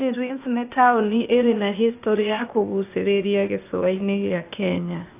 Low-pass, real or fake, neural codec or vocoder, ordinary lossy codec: 3.6 kHz; fake; codec, 16 kHz, 0.8 kbps, ZipCodec; AAC, 24 kbps